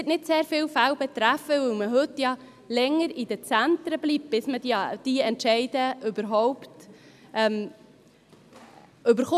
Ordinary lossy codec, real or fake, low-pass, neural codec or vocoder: none; real; 14.4 kHz; none